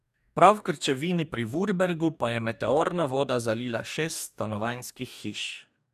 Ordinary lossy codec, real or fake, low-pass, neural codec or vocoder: none; fake; 14.4 kHz; codec, 44.1 kHz, 2.6 kbps, DAC